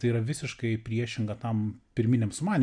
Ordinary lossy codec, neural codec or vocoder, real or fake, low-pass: MP3, 96 kbps; none; real; 9.9 kHz